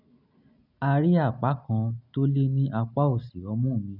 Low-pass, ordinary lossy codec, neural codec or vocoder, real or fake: 5.4 kHz; none; none; real